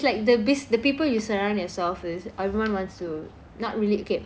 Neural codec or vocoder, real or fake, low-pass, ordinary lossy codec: none; real; none; none